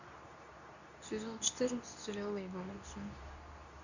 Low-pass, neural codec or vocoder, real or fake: 7.2 kHz; codec, 24 kHz, 0.9 kbps, WavTokenizer, medium speech release version 2; fake